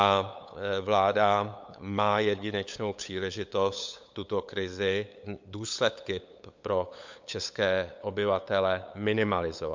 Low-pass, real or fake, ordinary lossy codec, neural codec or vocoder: 7.2 kHz; fake; MP3, 64 kbps; codec, 16 kHz, 8 kbps, FunCodec, trained on LibriTTS, 25 frames a second